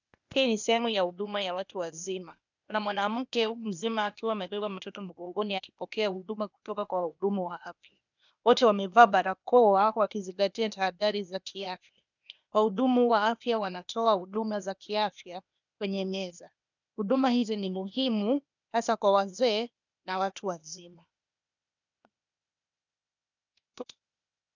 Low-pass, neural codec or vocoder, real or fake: 7.2 kHz; codec, 16 kHz, 0.8 kbps, ZipCodec; fake